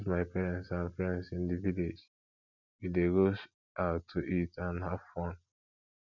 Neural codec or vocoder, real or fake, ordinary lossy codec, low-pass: none; real; none; 7.2 kHz